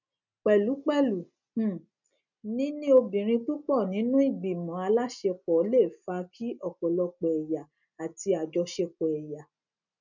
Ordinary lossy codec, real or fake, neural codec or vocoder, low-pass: none; real; none; 7.2 kHz